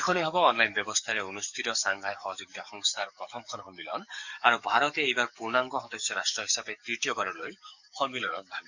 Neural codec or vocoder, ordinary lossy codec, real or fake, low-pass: codec, 44.1 kHz, 7.8 kbps, DAC; none; fake; 7.2 kHz